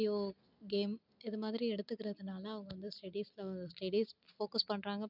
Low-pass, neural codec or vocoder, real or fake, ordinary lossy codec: 5.4 kHz; none; real; none